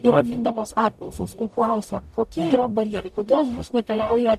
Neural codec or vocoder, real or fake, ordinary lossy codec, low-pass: codec, 44.1 kHz, 0.9 kbps, DAC; fake; MP3, 96 kbps; 14.4 kHz